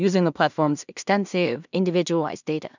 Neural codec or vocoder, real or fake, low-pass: codec, 16 kHz in and 24 kHz out, 0.4 kbps, LongCat-Audio-Codec, two codebook decoder; fake; 7.2 kHz